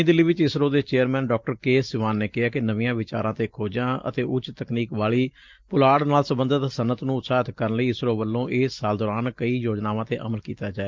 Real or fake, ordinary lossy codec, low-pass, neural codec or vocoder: real; Opus, 32 kbps; 7.2 kHz; none